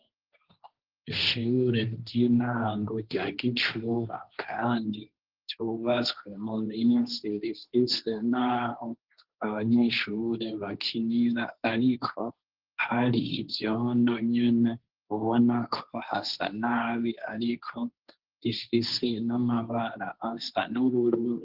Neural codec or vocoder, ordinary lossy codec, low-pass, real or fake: codec, 16 kHz, 1.1 kbps, Voila-Tokenizer; Opus, 16 kbps; 5.4 kHz; fake